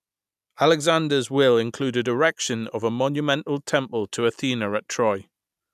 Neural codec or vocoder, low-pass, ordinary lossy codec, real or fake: none; 14.4 kHz; none; real